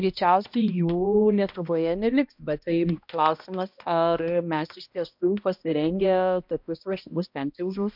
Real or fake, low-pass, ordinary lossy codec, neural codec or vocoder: fake; 5.4 kHz; MP3, 48 kbps; codec, 16 kHz, 1 kbps, X-Codec, HuBERT features, trained on balanced general audio